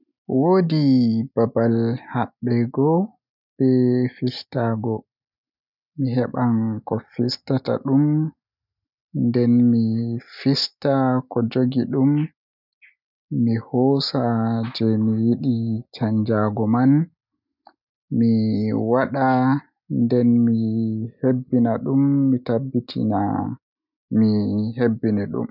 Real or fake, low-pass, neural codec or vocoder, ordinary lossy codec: real; 5.4 kHz; none; none